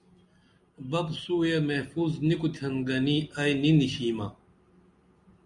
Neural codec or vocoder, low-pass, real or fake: none; 10.8 kHz; real